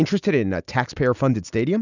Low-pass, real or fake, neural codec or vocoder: 7.2 kHz; real; none